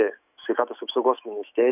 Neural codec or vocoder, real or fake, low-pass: autoencoder, 48 kHz, 128 numbers a frame, DAC-VAE, trained on Japanese speech; fake; 3.6 kHz